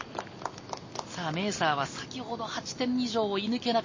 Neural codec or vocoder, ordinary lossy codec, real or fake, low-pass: none; MP3, 32 kbps; real; 7.2 kHz